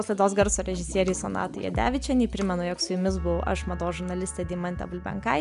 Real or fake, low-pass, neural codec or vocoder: real; 10.8 kHz; none